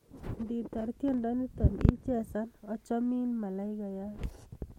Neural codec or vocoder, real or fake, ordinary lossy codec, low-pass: none; real; MP3, 64 kbps; 19.8 kHz